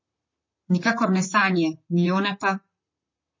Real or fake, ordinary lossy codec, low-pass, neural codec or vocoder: fake; MP3, 32 kbps; 7.2 kHz; vocoder, 44.1 kHz, 128 mel bands, Pupu-Vocoder